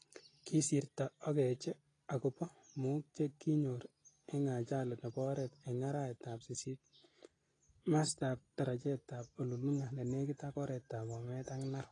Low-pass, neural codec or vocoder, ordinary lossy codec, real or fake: 9.9 kHz; none; AAC, 32 kbps; real